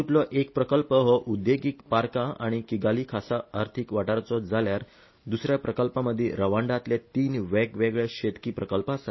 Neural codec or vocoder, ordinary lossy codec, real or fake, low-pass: none; MP3, 24 kbps; real; 7.2 kHz